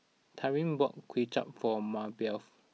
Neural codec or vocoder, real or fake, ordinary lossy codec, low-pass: none; real; none; none